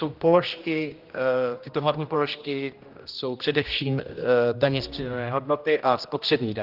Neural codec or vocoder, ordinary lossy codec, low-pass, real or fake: codec, 16 kHz, 1 kbps, X-Codec, HuBERT features, trained on general audio; Opus, 32 kbps; 5.4 kHz; fake